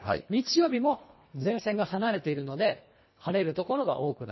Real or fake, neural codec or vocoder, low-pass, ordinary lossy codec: fake; codec, 24 kHz, 1.5 kbps, HILCodec; 7.2 kHz; MP3, 24 kbps